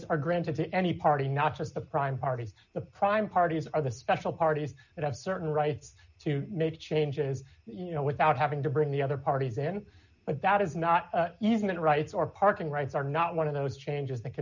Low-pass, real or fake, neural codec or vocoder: 7.2 kHz; real; none